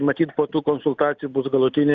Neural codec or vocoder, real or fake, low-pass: none; real; 7.2 kHz